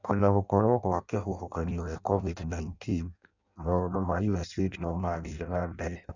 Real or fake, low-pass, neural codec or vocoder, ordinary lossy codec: fake; 7.2 kHz; codec, 16 kHz in and 24 kHz out, 0.6 kbps, FireRedTTS-2 codec; none